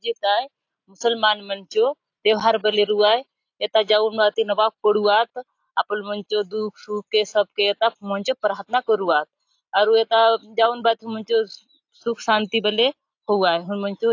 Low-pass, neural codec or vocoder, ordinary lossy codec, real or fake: 7.2 kHz; none; AAC, 48 kbps; real